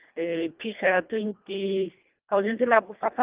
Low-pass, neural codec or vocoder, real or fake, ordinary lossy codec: 3.6 kHz; codec, 24 kHz, 1.5 kbps, HILCodec; fake; Opus, 32 kbps